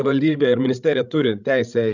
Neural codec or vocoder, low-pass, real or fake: codec, 16 kHz, 16 kbps, FreqCodec, larger model; 7.2 kHz; fake